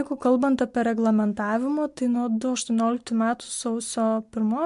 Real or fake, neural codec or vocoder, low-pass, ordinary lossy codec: real; none; 10.8 kHz; MP3, 64 kbps